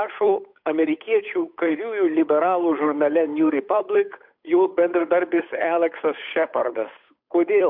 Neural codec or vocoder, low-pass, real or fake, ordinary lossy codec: codec, 16 kHz, 8 kbps, FunCodec, trained on Chinese and English, 25 frames a second; 5.4 kHz; fake; MP3, 48 kbps